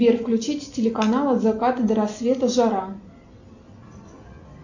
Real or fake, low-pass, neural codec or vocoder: real; 7.2 kHz; none